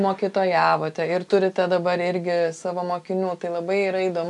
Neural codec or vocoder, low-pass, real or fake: none; 10.8 kHz; real